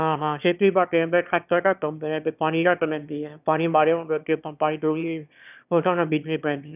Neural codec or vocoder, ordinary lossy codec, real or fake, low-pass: autoencoder, 22.05 kHz, a latent of 192 numbers a frame, VITS, trained on one speaker; none; fake; 3.6 kHz